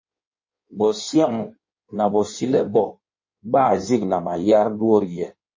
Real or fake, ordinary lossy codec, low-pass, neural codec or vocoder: fake; MP3, 32 kbps; 7.2 kHz; codec, 16 kHz in and 24 kHz out, 1.1 kbps, FireRedTTS-2 codec